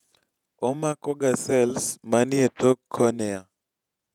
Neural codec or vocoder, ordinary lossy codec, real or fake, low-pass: vocoder, 48 kHz, 128 mel bands, Vocos; none; fake; 19.8 kHz